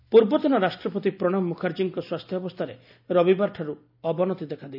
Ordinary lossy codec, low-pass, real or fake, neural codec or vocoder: none; 5.4 kHz; real; none